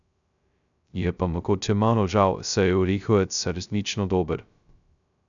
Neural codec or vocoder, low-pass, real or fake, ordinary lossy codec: codec, 16 kHz, 0.2 kbps, FocalCodec; 7.2 kHz; fake; none